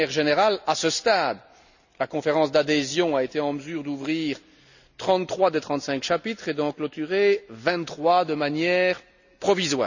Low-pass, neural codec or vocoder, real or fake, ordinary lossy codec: 7.2 kHz; none; real; none